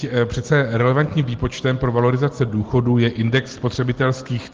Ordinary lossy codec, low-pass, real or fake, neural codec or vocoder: Opus, 16 kbps; 7.2 kHz; real; none